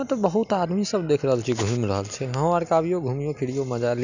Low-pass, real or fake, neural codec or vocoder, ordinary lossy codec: 7.2 kHz; real; none; none